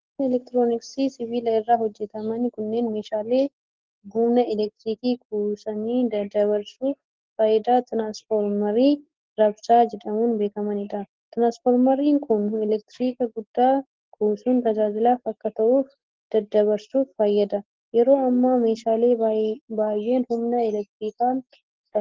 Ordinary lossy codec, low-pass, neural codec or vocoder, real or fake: Opus, 16 kbps; 7.2 kHz; none; real